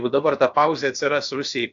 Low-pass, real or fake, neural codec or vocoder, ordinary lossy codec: 7.2 kHz; fake; codec, 16 kHz, about 1 kbps, DyCAST, with the encoder's durations; AAC, 48 kbps